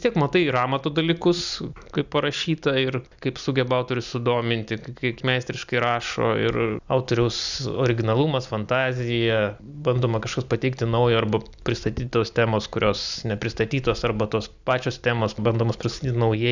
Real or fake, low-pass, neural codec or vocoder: real; 7.2 kHz; none